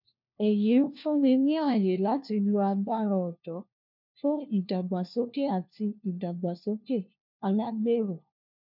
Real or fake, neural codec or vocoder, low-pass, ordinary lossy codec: fake; codec, 16 kHz, 1 kbps, FunCodec, trained on LibriTTS, 50 frames a second; 5.4 kHz; none